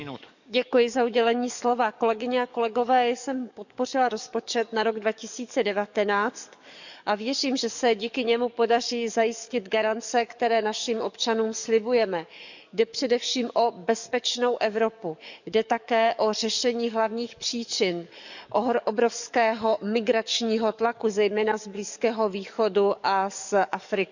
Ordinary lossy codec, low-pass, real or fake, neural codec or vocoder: none; 7.2 kHz; fake; codec, 44.1 kHz, 7.8 kbps, DAC